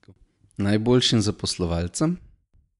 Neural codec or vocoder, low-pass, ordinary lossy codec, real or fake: none; 10.8 kHz; none; real